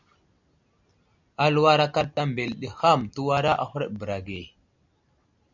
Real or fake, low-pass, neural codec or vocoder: real; 7.2 kHz; none